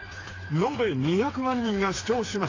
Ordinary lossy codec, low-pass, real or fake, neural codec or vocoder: AAC, 32 kbps; 7.2 kHz; fake; codec, 16 kHz, 4 kbps, FreqCodec, smaller model